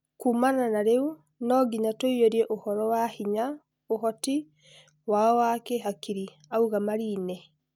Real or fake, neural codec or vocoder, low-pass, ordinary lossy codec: real; none; 19.8 kHz; none